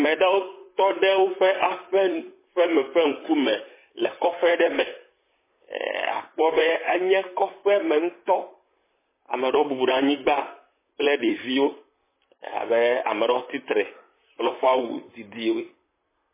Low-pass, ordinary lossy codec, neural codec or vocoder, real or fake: 3.6 kHz; MP3, 16 kbps; vocoder, 44.1 kHz, 128 mel bands, Pupu-Vocoder; fake